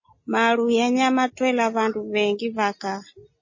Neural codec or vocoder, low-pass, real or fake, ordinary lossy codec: none; 7.2 kHz; real; MP3, 32 kbps